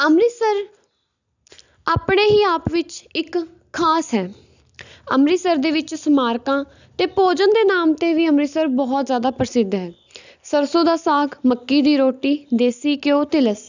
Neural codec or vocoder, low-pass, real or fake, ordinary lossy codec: none; 7.2 kHz; real; none